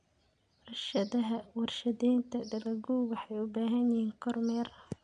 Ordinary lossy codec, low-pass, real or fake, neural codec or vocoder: none; 9.9 kHz; real; none